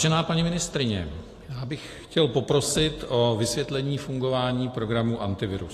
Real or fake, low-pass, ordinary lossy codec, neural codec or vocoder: real; 14.4 kHz; AAC, 48 kbps; none